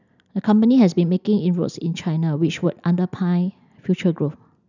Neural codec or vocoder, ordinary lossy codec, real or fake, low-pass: none; none; real; 7.2 kHz